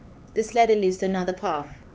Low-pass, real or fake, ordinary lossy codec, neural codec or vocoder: none; fake; none; codec, 16 kHz, 4 kbps, X-Codec, HuBERT features, trained on LibriSpeech